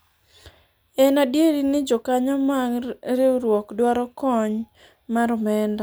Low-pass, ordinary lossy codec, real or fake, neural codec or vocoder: none; none; real; none